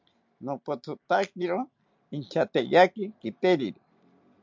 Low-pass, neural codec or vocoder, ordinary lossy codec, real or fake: 7.2 kHz; none; MP3, 64 kbps; real